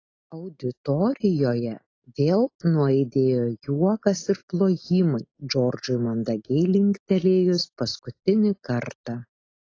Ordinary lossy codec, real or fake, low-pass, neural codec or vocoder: AAC, 32 kbps; real; 7.2 kHz; none